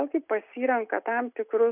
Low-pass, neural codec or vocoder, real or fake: 3.6 kHz; none; real